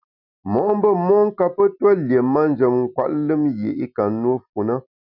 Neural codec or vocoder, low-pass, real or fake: none; 5.4 kHz; real